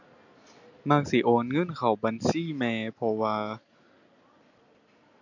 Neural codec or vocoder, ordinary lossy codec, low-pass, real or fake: none; none; 7.2 kHz; real